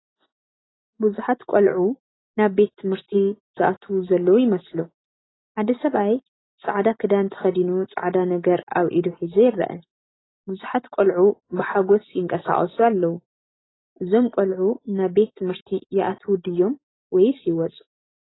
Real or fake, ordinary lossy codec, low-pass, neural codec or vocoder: real; AAC, 16 kbps; 7.2 kHz; none